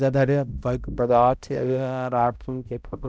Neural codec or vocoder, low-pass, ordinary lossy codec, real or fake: codec, 16 kHz, 0.5 kbps, X-Codec, HuBERT features, trained on balanced general audio; none; none; fake